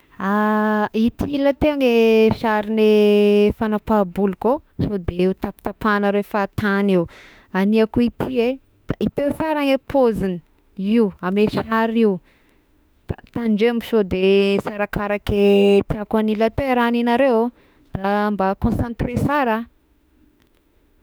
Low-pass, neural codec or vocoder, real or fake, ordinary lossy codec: none; autoencoder, 48 kHz, 32 numbers a frame, DAC-VAE, trained on Japanese speech; fake; none